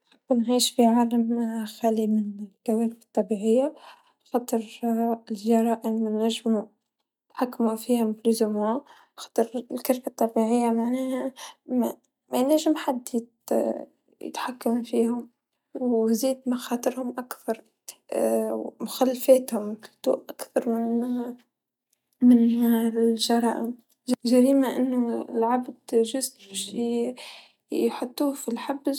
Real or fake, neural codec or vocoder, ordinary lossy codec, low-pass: real; none; none; 19.8 kHz